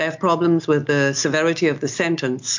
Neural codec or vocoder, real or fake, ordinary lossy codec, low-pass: none; real; MP3, 48 kbps; 7.2 kHz